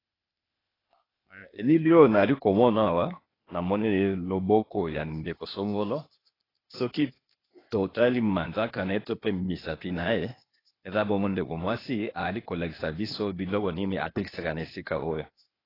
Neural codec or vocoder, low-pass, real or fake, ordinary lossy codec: codec, 16 kHz, 0.8 kbps, ZipCodec; 5.4 kHz; fake; AAC, 24 kbps